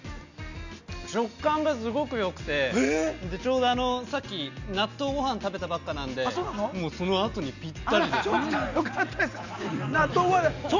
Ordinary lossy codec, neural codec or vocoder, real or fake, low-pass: none; none; real; 7.2 kHz